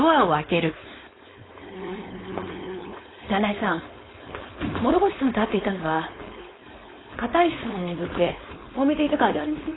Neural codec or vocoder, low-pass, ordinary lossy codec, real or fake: codec, 16 kHz, 4.8 kbps, FACodec; 7.2 kHz; AAC, 16 kbps; fake